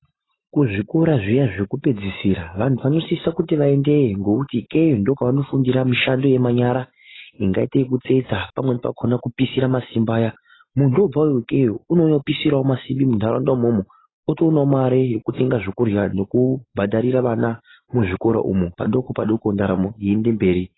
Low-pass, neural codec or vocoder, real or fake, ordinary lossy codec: 7.2 kHz; none; real; AAC, 16 kbps